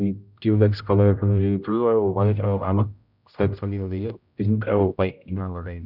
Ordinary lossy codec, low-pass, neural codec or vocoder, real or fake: none; 5.4 kHz; codec, 16 kHz, 0.5 kbps, X-Codec, HuBERT features, trained on general audio; fake